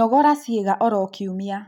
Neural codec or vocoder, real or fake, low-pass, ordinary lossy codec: none; real; 19.8 kHz; none